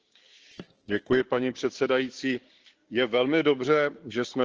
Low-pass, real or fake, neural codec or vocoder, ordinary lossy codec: 7.2 kHz; fake; codec, 16 kHz, 6 kbps, DAC; Opus, 16 kbps